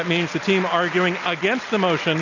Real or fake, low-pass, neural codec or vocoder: real; 7.2 kHz; none